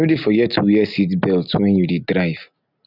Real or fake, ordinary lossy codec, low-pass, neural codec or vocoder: real; none; 5.4 kHz; none